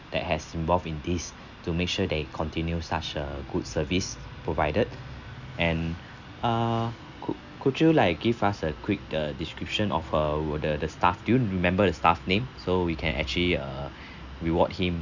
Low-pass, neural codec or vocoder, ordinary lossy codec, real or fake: 7.2 kHz; none; none; real